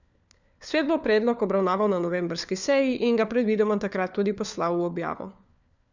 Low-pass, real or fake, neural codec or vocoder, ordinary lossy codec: 7.2 kHz; fake; codec, 16 kHz, 4 kbps, FunCodec, trained on LibriTTS, 50 frames a second; none